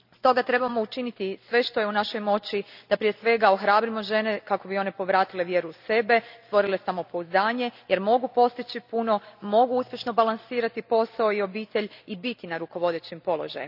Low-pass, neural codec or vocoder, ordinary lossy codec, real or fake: 5.4 kHz; none; none; real